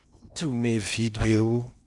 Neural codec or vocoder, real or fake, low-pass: codec, 16 kHz in and 24 kHz out, 0.8 kbps, FocalCodec, streaming, 65536 codes; fake; 10.8 kHz